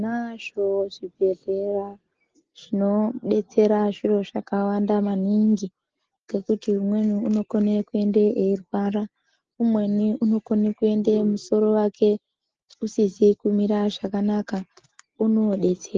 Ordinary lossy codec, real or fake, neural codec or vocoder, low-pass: Opus, 16 kbps; real; none; 7.2 kHz